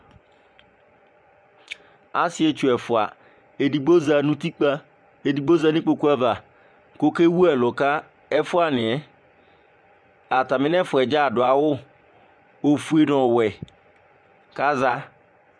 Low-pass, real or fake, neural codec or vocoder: 9.9 kHz; fake; vocoder, 24 kHz, 100 mel bands, Vocos